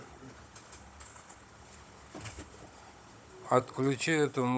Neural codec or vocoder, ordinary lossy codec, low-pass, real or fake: codec, 16 kHz, 16 kbps, FunCodec, trained on Chinese and English, 50 frames a second; none; none; fake